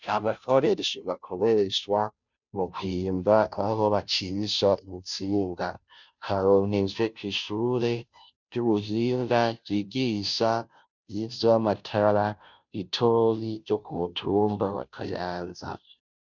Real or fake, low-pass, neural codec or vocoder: fake; 7.2 kHz; codec, 16 kHz, 0.5 kbps, FunCodec, trained on Chinese and English, 25 frames a second